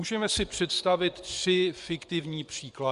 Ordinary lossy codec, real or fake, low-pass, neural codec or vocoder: Opus, 64 kbps; fake; 10.8 kHz; vocoder, 24 kHz, 100 mel bands, Vocos